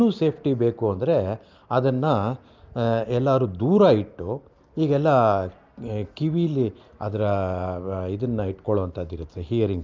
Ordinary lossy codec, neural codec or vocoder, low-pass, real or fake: Opus, 32 kbps; none; 7.2 kHz; real